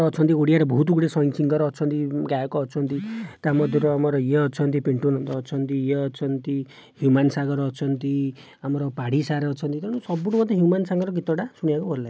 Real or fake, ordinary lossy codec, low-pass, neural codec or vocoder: real; none; none; none